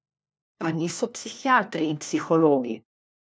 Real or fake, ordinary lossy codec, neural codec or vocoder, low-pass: fake; none; codec, 16 kHz, 1 kbps, FunCodec, trained on LibriTTS, 50 frames a second; none